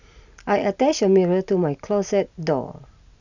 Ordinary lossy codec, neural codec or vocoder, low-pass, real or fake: none; none; 7.2 kHz; real